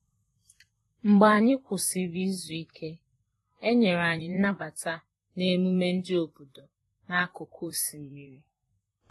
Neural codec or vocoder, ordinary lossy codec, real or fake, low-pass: vocoder, 44.1 kHz, 128 mel bands, Pupu-Vocoder; AAC, 32 kbps; fake; 19.8 kHz